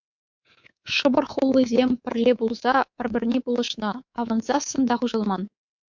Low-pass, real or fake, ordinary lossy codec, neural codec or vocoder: 7.2 kHz; fake; MP3, 64 kbps; codec, 24 kHz, 3.1 kbps, DualCodec